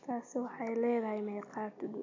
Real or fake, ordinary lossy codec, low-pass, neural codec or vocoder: real; AAC, 48 kbps; 7.2 kHz; none